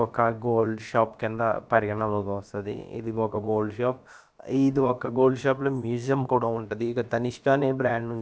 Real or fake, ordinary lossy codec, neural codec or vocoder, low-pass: fake; none; codec, 16 kHz, about 1 kbps, DyCAST, with the encoder's durations; none